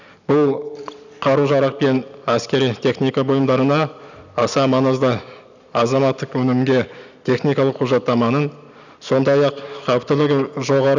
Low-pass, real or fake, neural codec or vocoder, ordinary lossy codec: 7.2 kHz; real; none; none